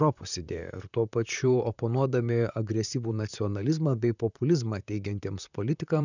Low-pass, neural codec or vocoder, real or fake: 7.2 kHz; vocoder, 44.1 kHz, 128 mel bands, Pupu-Vocoder; fake